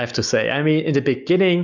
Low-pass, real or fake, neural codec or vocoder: 7.2 kHz; real; none